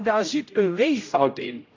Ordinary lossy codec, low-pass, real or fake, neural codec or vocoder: AAC, 48 kbps; 7.2 kHz; fake; codec, 16 kHz, 0.5 kbps, X-Codec, HuBERT features, trained on general audio